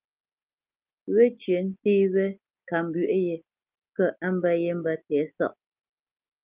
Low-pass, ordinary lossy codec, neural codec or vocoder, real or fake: 3.6 kHz; Opus, 24 kbps; none; real